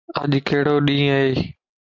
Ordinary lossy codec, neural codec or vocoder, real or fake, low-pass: MP3, 64 kbps; none; real; 7.2 kHz